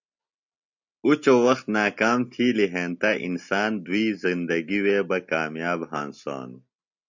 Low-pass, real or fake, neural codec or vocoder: 7.2 kHz; real; none